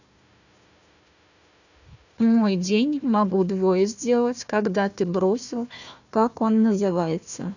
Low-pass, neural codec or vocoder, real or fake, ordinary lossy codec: 7.2 kHz; codec, 16 kHz, 1 kbps, FunCodec, trained on Chinese and English, 50 frames a second; fake; none